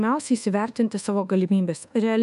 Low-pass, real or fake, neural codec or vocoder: 10.8 kHz; fake; codec, 24 kHz, 1.2 kbps, DualCodec